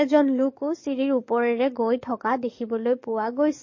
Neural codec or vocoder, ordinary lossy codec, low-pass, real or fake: codec, 44.1 kHz, 7.8 kbps, DAC; MP3, 32 kbps; 7.2 kHz; fake